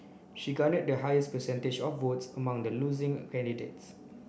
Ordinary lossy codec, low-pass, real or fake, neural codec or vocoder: none; none; real; none